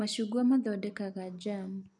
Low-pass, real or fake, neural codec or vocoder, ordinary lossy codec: 10.8 kHz; real; none; none